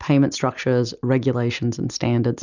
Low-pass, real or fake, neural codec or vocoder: 7.2 kHz; real; none